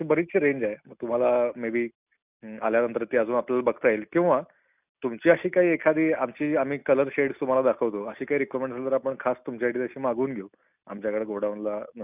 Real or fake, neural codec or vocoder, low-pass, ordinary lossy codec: real; none; 3.6 kHz; none